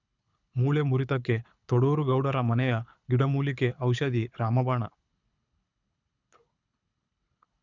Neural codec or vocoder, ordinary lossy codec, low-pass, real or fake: codec, 24 kHz, 6 kbps, HILCodec; none; 7.2 kHz; fake